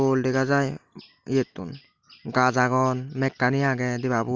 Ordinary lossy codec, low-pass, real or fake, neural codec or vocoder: Opus, 32 kbps; 7.2 kHz; real; none